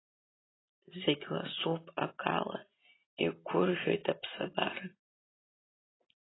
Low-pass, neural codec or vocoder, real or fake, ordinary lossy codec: 7.2 kHz; none; real; AAC, 16 kbps